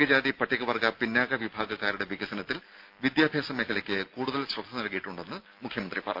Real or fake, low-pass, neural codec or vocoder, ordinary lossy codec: real; 5.4 kHz; none; Opus, 24 kbps